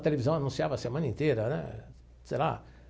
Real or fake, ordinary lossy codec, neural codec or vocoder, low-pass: real; none; none; none